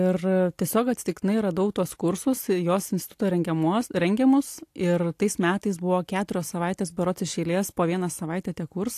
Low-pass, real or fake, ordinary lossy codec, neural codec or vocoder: 14.4 kHz; real; AAC, 64 kbps; none